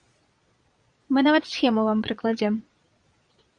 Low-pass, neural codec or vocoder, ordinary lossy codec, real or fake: 9.9 kHz; vocoder, 22.05 kHz, 80 mel bands, Vocos; Opus, 64 kbps; fake